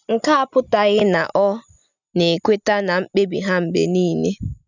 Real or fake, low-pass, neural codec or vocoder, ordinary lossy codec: real; 7.2 kHz; none; none